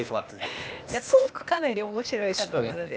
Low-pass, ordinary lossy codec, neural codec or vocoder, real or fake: none; none; codec, 16 kHz, 0.8 kbps, ZipCodec; fake